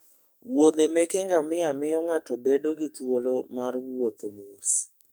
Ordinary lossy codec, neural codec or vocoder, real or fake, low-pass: none; codec, 44.1 kHz, 2.6 kbps, SNAC; fake; none